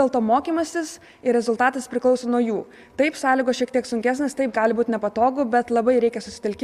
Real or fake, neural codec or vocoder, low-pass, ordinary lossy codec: real; none; 14.4 kHz; Opus, 64 kbps